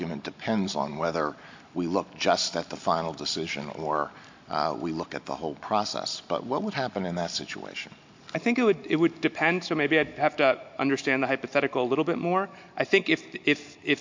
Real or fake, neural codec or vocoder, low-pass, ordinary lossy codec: real; none; 7.2 kHz; MP3, 48 kbps